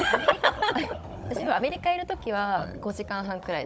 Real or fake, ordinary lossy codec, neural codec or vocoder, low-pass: fake; none; codec, 16 kHz, 16 kbps, FunCodec, trained on Chinese and English, 50 frames a second; none